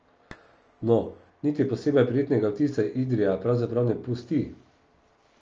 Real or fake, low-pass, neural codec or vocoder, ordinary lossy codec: real; 7.2 kHz; none; Opus, 24 kbps